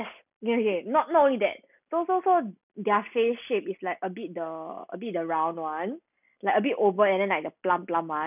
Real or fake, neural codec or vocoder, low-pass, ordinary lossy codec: real; none; 3.6 kHz; none